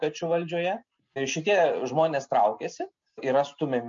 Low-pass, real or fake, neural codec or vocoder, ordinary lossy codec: 7.2 kHz; real; none; MP3, 48 kbps